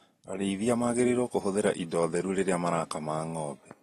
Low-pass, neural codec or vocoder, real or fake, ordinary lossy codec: 19.8 kHz; none; real; AAC, 32 kbps